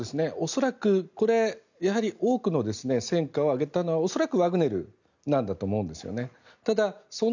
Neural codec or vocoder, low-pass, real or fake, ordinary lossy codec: none; 7.2 kHz; real; none